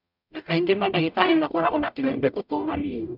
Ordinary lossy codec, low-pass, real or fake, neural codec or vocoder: none; 5.4 kHz; fake; codec, 44.1 kHz, 0.9 kbps, DAC